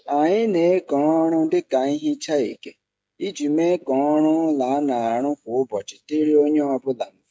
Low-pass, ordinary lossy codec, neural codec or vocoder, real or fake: none; none; codec, 16 kHz, 16 kbps, FreqCodec, smaller model; fake